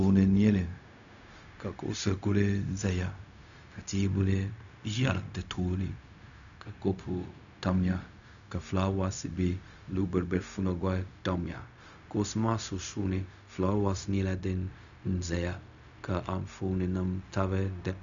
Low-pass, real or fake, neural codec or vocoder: 7.2 kHz; fake; codec, 16 kHz, 0.4 kbps, LongCat-Audio-Codec